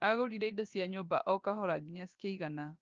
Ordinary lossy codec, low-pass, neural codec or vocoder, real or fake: Opus, 24 kbps; 7.2 kHz; codec, 16 kHz, 0.7 kbps, FocalCodec; fake